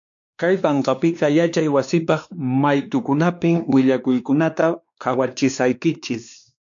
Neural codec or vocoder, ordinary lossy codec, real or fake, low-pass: codec, 16 kHz, 2 kbps, X-Codec, WavLM features, trained on Multilingual LibriSpeech; MP3, 64 kbps; fake; 7.2 kHz